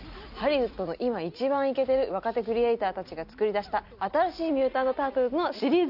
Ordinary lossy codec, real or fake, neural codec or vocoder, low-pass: none; real; none; 5.4 kHz